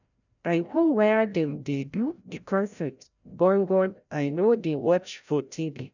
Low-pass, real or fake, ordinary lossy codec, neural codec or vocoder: 7.2 kHz; fake; none; codec, 16 kHz, 0.5 kbps, FreqCodec, larger model